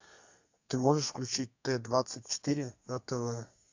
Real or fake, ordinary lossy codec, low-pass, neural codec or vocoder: fake; AAC, 48 kbps; 7.2 kHz; codec, 32 kHz, 1.9 kbps, SNAC